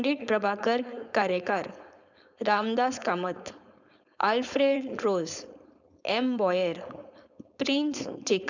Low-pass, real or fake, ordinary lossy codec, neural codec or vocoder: 7.2 kHz; fake; none; codec, 16 kHz, 4.8 kbps, FACodec